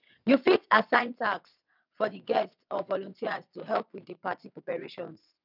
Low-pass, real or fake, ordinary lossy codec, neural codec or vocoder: 5.4 kHz; fake; none; vocoder, 22.05 kHz, 80 mel bands, WaveNeXt